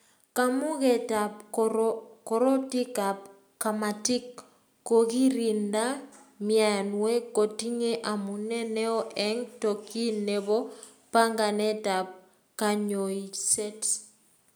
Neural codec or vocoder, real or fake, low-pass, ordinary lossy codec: none; real; none; none